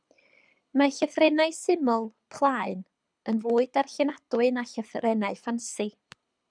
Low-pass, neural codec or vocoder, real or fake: 9.9 kHz; codec, 24 kHz, 6 kbps, HILCodec; fake